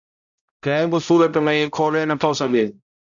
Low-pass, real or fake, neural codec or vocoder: 7.2 kHz; fake; codec, 16 kHz, 0.5 kbps, X-Codec, HuBERT features, trained on balanced general audio